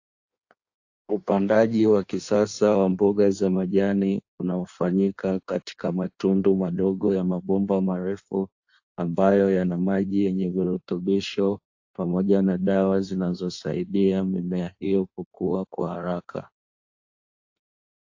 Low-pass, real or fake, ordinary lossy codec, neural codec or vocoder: 7.2 kHz; fake; AAC, 48 kbps; codec, 16 kHz in and 24 kHz out, 1.1 kbps, FireRedTTS-2 codec